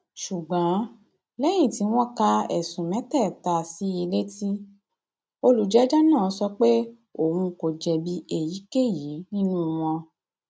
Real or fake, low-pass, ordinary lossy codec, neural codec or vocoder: real; none; none; none